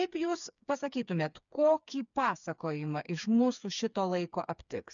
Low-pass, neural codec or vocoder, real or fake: 7.2 kHz; codec, 16 kHz, 4 kbps, FreqCodec, smaller model; fake